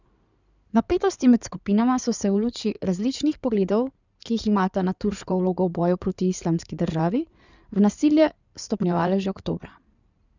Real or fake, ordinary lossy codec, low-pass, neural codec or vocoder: fake; none; 7.2 kHz; codec, 16 kHz in and 24 kHz out, 2.2 kbps, FireRedTTS-2 codec